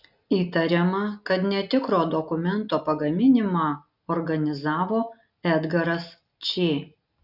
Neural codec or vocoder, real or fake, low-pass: none; real; 5.4 kHz